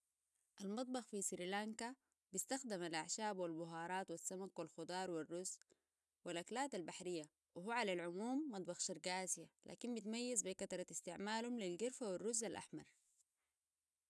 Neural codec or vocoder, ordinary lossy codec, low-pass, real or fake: none; none; none; real